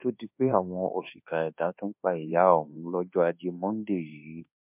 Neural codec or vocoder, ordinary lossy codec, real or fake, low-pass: codec, 24 kHz, 1.2 kbps, DualCodec; MP3, 32 kbps; fake; 3.6 kHz